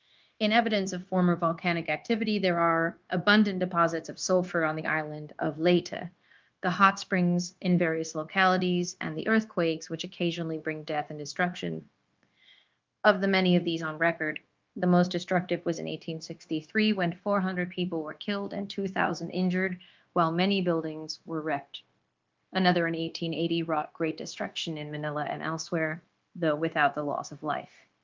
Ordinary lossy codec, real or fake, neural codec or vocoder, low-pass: Opus, 32 kbps; fake; codec, 16 kHz, 0.9 kbps, LongCat-Audio-Codec; 7.2 kHz